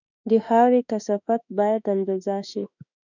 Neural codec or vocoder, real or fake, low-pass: autoencoder, 48 kHz, 32 numbers a frame, DAC-VAE, trained on Japanese speech; fake; 7.2 kHz